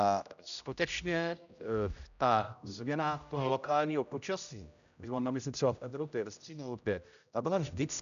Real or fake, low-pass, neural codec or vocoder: fake; 7.2 kHz; codec, 16 kHz, 0.5 kbps, X-Codec, HuBERT features, trained on general audio